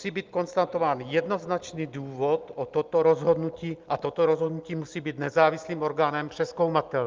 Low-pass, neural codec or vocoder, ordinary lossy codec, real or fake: 7.2 kHz; none; Opus, 24 kbps; real